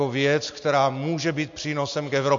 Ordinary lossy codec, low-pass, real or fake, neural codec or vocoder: MP3, 48 kbps; 7.2 kHz; real; none